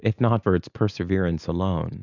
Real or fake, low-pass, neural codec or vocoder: real; 7.2 kHz; none